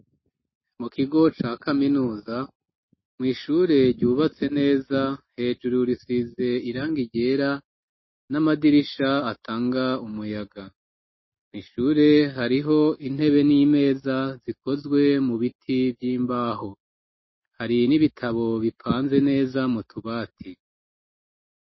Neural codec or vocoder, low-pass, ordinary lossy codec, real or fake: none; 7.2 kHz; MP3, 24 kbps; real